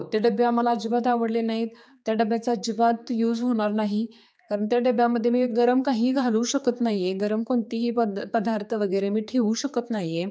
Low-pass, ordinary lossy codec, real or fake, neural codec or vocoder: none; none; fake; codec, 16 kHz, 4 kbps, X-Codec, HuBERT features, trained on general audio